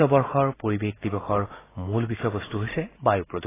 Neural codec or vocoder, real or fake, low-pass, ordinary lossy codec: none; real; 3.6 kHz; AAC, 16 kbps